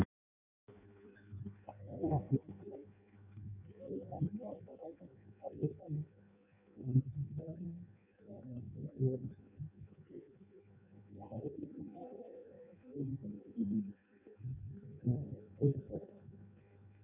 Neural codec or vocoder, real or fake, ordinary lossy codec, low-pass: codec, 16 kHz in and 24 kHz out, 0.6 kbps, FireRedTTS-2 codec; fake; none; 3.6 kHz